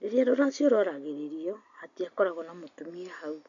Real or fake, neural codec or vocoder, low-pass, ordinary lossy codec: real; none; 7.2 kHz; none